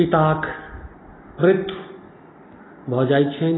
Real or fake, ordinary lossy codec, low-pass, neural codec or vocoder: real; AAC, 16 kbps; 7.2 kHz; none